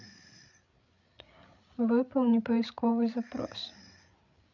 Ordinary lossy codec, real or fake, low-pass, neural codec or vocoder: none; fake; 7.2 kHz; codec, 16 kHz, 8 kbps, FreqCodec, larger model